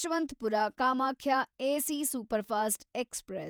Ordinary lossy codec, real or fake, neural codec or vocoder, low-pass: none; fake; vocoder, 48 kHz, 128 mel bands, Vocos; none